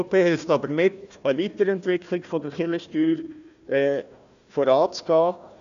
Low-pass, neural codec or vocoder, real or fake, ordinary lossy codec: 7.2 kHz; codec, 16 kHz, 1 kbps, FunCodec, trained on Chinese and English, 50 frames a second; fake; none